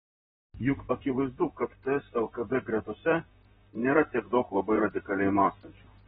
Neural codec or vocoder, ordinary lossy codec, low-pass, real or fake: vocoder, 44.1 kHz, 128 mel bands, Pupu-Vocoder; AAC, 16 kbps; 19.8 kHz; fake